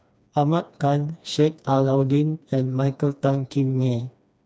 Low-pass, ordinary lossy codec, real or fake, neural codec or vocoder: none; none; fake; codec, 16 kHz, 2 kbps, FreqCodec, smaller model